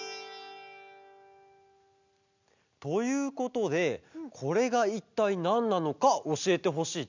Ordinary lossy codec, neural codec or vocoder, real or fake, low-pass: none; none; real; 7.2 kHz